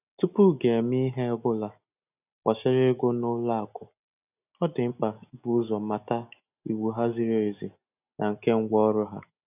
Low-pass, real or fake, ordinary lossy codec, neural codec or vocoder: 3.6 kHz; real; none; none